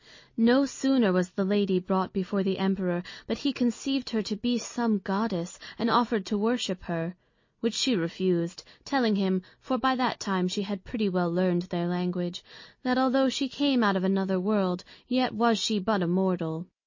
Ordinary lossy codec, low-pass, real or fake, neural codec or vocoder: MP3, 32 kbps; 7.2 kHz; real; none